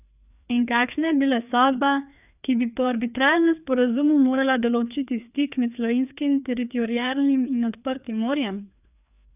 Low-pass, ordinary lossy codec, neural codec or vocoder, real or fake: 3.6 kHz; none; codec, 16 kHz, 2 kbps, FreqCodec, larger model; fake